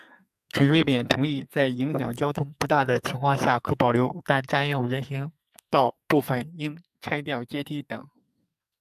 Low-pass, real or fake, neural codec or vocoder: 14.4 kHz; fake; codec, 44.1 kHz, 2.6 kbps, SNAC